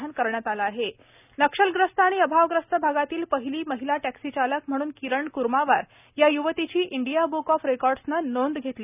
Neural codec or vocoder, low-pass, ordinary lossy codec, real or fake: none; 3.6 kHz; none; real